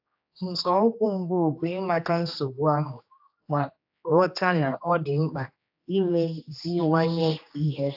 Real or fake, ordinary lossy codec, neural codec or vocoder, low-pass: fake; AAC, 48 kbps; codec, 16 kHz, 2 kbps, X-Codec, HuBERT features, trained on general audio; 5.4 kHz